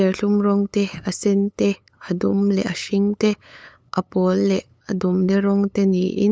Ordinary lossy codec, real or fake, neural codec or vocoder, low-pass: none; fake; codec, 16 kHz, 8 kbps, FunCodec, trained on LibriTTS, 25 frames a second; none